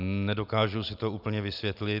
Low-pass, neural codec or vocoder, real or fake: 5.4 kHz; none; real